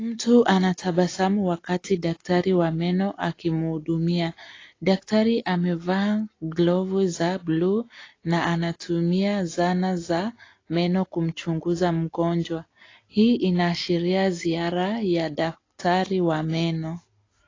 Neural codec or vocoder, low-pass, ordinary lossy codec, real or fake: none; 7.2 kHz; AAC, 32 kbps; real